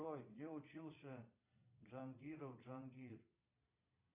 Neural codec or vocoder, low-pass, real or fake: vocoder, 22.05 kHz, 80 mel bands, Vocos; 3.6 kHz; fake